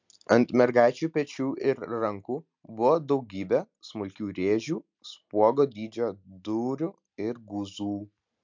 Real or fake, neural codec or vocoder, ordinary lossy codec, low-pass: real; none; MP3, 64 kbps; 7.2 kHz